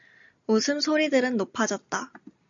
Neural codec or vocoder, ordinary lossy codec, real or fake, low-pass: none; AAC, 64 kbps; real; 7.2 kHz